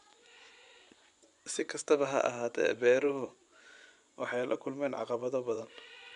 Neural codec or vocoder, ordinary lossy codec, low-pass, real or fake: vocoder, 24 kHz, 100 mel bands, Vocos; none; 10.8 kHz; fake